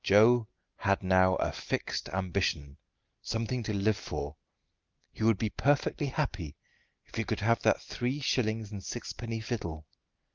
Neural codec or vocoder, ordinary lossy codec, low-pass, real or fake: none; Opus, 24 kbps; 7.2 kHz; real